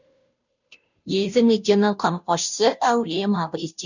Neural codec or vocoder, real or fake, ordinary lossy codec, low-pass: codec, 16 kHz, 0.5 kbps, FunCodec, trained on Chinese and English, 25 frames a second; fake; none; 7.2 kHz